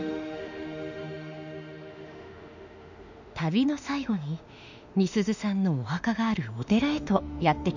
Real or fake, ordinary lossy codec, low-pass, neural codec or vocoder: fake; none; 7.2 kHz; autoencoder, 48 kHz, 32 numbers a frame, DAC-VAE, trained on Japanese speech